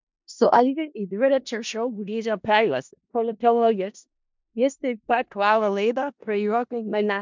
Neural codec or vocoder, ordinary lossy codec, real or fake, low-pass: codec, 16 kHz in and 24 kHz out, 0.4 kbps, LongCat-Audio-Codec, four codebook decoder; MP3, 64 kbps; fake; 7.2 kHz